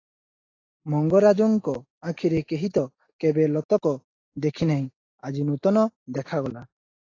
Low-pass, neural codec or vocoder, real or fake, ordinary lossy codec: 7.2 kHz; none; real; AAC, 32 kbps